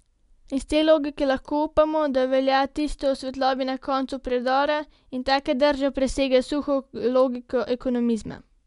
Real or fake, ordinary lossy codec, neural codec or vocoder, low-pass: real; MP3, 96 kbps; none; 10.8 kHz